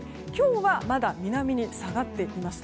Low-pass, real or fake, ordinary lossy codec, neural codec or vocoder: none; real; none; none